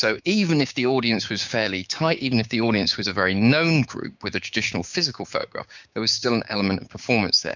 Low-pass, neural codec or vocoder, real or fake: 7.2 kHz; codec, 16 kHz, 6 kbps, DAC; fake